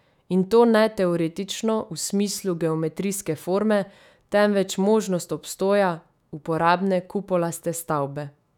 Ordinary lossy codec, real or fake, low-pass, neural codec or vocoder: none; fake; 19.8 kHz; autoencoder, 48 kHz, 128 numbers a frame, DAC-VAE, trained on Japanese speech